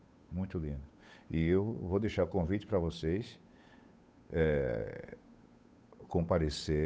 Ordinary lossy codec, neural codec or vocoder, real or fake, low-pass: none; codec, 16 kHz, 8 kbps, FunCodec, trained on Chinese and English, 25 frames a second; fake; none